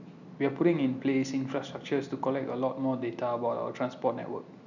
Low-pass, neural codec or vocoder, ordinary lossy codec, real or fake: 7.2 kHz; none; none; real